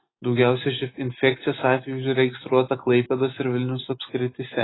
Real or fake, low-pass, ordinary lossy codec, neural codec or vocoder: real; 7.2 kHz; AAC, 16 kbps; none